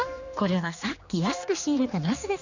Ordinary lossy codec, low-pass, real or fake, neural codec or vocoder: none; 7.2 kHz; fake; codec, 16 kHz, 2 kbps, X-Codec, HuBERT features, trained on general audio